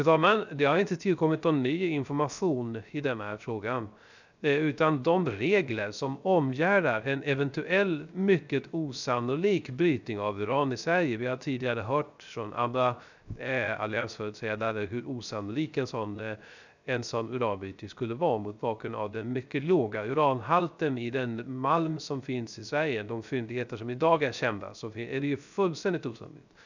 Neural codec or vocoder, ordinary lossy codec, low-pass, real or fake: codec, 16 kHz, 0.3 kbps, FocalCodec; none; 7.2 kHz; fake